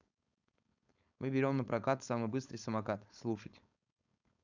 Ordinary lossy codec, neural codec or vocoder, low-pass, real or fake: none; codec, 16 kHz, 4.8 kbps, FACodec; 7.2 kHz; fake